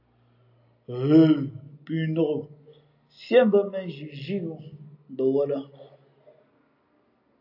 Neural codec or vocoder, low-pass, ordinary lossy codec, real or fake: none; 5.4 kHz; AAC, 48 kbps; real